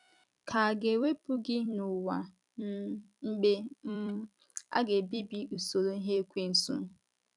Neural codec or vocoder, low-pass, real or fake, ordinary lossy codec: vocoder, 44.1 kHz, 128 mel bands every 256 samples, BigVGAN v2; 10.8 kHz; fake; none